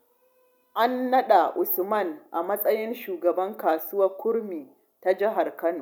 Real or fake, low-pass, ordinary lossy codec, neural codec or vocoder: real; 19.8 kHz; none; none